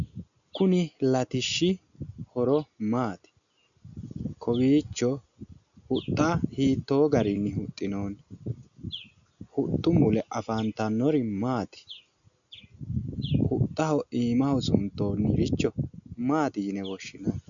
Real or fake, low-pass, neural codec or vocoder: real; 7.2 kHz; none